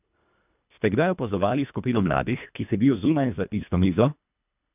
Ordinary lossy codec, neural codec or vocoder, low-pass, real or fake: none; codec, 24 kHz, 1.5 kbps, HILCodec; 3.6 kHz; fake